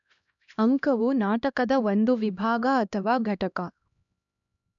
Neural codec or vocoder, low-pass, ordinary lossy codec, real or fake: codec, 16 kHz, 1 kbps, X-Codec, HuBERT features, trained on LibriSpeech; 7.2 kHz; MP3, 96 kbps; fake